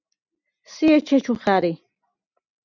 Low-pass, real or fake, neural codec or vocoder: 7.2 kHz; real; none